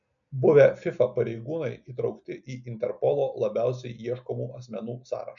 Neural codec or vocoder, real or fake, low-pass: none; real; 7.2 kHz